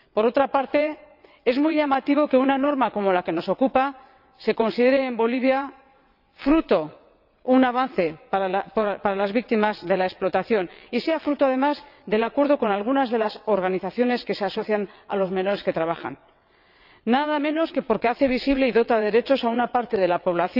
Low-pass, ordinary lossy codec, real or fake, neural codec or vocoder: 5.4 kHz; none; fake; vocoder, 22.05 kHz, 80 mel bands, WaveNeXt